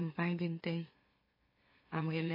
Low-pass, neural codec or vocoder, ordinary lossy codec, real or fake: 5.4 kHz; autoencoder, 44.1 kHz, a latent of 192 numbers a frame, MeloTTS; MP3, 24 kbps; fake